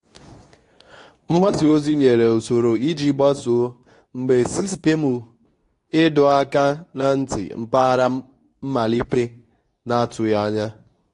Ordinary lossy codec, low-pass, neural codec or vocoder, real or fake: AAC, 48 kbps; 10.8 kHz; codec, 24 kHz, 0.9 kbps, WavTokenizer, medium speech release version 2; fake